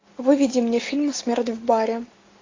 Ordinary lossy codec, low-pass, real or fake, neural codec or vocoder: AAC, 32 kbps; 7.2 kHz; real; none